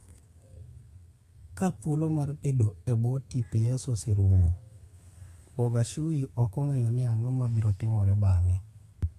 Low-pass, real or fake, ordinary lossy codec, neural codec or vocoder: 14.4 kHz; fake; AAC, 64 kbps; codec, 32 kHz, 1.9 kbps, SNAC